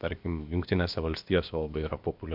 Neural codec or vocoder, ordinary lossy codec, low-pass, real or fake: codec, 16 kHz, about 1 kbps, DyCAST, with the encoder's durations; MP3, 48 kbps; 5.4 kHz; fake